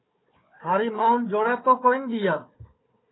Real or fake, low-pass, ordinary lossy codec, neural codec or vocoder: fake; 7.2 kHz; AAC, 16 kbps; codec, 16 kHz, 4 kbps, FunCodec, trained on Chinese and English, 50 frames a second